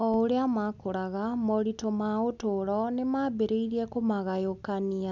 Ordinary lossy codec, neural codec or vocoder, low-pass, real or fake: none; none; 7.2 kHz; real